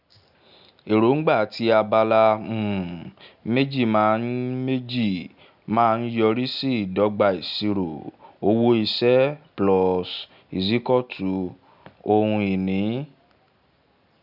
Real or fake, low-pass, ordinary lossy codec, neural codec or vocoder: real; 5.4 kHz; none; none